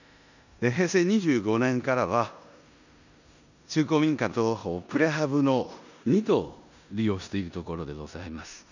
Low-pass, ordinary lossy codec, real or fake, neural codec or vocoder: 7.2 kHz; none; fake; codec, 16 kHz in and 24 kHz out, 0.9 kbps, LongCat-Audio-Codec, four codebook decoder